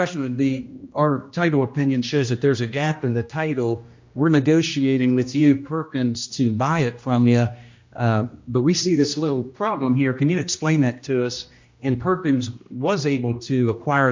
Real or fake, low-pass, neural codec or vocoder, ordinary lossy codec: fake; 7.2 kHz; codec, 16 kHz, 1 kbps, X-Codec, HuBERT features, trained on general audio; MP3, 48 kbps